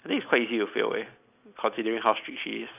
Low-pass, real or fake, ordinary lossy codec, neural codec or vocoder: 3.6 kHz; real; AAC, 32 kbps; none